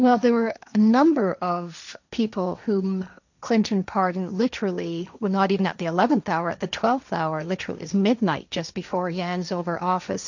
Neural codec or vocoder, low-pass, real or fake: codec, 16 kHz, 1.1 kbps, Voila-Tokenizer; 7.2 kHz; fake